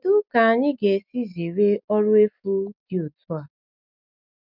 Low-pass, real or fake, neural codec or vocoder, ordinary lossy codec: 5.4 kHz; real; none; none